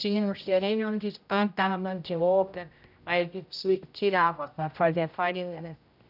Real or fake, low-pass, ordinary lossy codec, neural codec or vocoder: fake; 5.4 kHz; none; codec, 16 kHz, 0.5 kbps, X-Codec, HuBERT features, trained on general audio